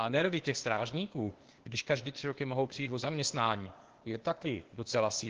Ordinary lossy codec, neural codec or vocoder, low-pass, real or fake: Opus, 16 kbps; codec, 16 kHz, 0.8 kbps, ZipCodec; 7.2 kHz; fake